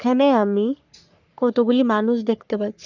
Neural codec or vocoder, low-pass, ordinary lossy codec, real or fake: codec, 44.1 kHz, 3.4 kbps, Pupu-Codec; 7.2 kHz; none; fake